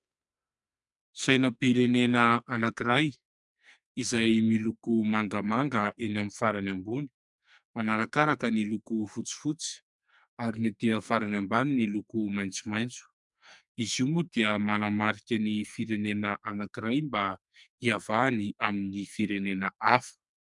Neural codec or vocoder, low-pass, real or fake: codec, 44.1 kHz, 2.6 kbps, SNAC; 10.8 kHz; fake